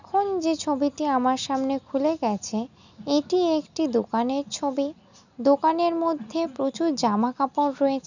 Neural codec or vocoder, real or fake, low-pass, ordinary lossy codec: none; real; 7.2 kHz; none